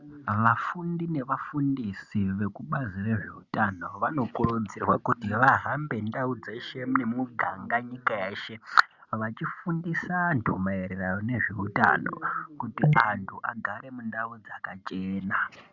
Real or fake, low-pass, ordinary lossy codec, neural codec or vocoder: real; 7.2 kHz; Opus, 64 kbps; none